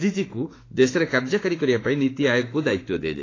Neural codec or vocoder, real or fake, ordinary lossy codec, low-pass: autoencoder, 48 kHz, 32 numbers a frame, DAC-VAE, trained on Japanese speech; fake; AAC, 32 kbps; 7.2 kHz